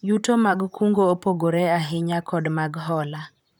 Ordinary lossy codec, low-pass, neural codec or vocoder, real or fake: none; 19.8 kHz; vocoder, 44.1 kHz, 128 mel bands, Pupu-Vocoder; fake